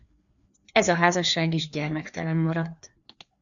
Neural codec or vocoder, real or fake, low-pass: codec, 16 kHz, 2 kbps, FreqCodec, larger model; fake; 7.2 kHz